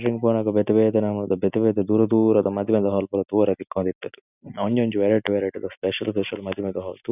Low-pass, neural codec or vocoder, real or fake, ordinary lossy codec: 3.6 kHz; none; real; none